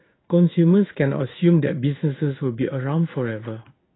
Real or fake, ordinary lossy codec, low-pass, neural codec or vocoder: fake; AAC, 16 kbps; 7.2 kHz; codec, 16 kHz in and 24 kHz out, 1 kbps, XY-Tokenizer